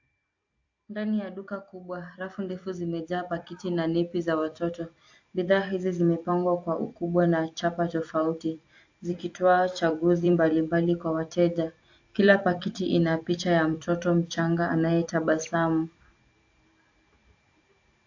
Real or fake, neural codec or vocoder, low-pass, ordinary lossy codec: fake; vocoder, 44.1 kHz, 128 mel bands every 256 samples, BigVGAN v2; 7.2 kHz; AAC, 48 kbps